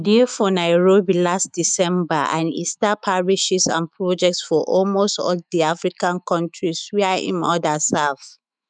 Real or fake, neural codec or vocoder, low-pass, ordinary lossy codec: fake; autoencoder, 48 kHz, 128 numbers a frame, DAC-VAE, trained on Japanese speech; 9.9 kHz; none